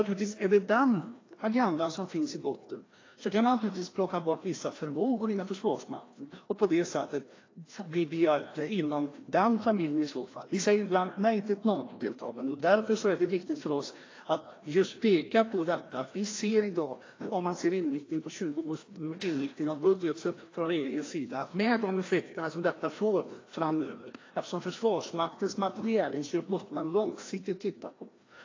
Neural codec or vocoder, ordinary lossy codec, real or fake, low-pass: codec, 16 kHz, 1 kbps, FreqCodec, larger model; AAC, 32 kbps; fake; 7.2 kHz